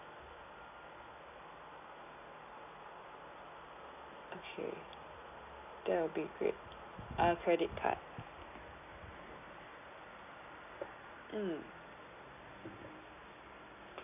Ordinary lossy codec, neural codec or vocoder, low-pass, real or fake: none; none; 3.6 kHz; real